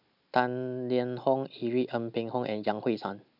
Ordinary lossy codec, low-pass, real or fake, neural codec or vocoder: none; 5.4 kHz; real; none